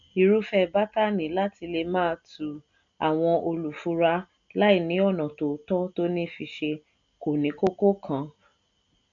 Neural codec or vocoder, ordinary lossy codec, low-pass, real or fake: none; none; 7.2 kHz; real